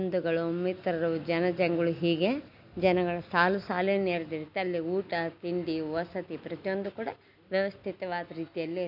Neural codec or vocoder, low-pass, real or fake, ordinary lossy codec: none; 5.4 kHz; real; none